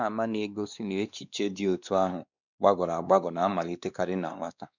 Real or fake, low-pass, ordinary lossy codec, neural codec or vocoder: fake; 7.2 kHz; none; codec, 16 kHz, 2 kbps, X-Codec, WavLM features, trained on Multilingual LibriSpeech